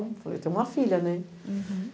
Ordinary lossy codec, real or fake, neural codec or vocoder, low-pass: none; real; none; none